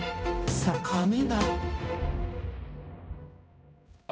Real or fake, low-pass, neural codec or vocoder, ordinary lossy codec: fake; none; codec, 16 kHz, 0.5 kbps, X-Codec, HuBERT features, trained on balanced general audio; none